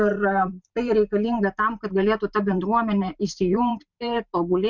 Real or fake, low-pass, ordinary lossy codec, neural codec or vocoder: fake; 7.2 kHz; MP3, 64 kbps; vocoder, 44.1 kHz, 128 mel bands every 512 samples, BigVGAN v2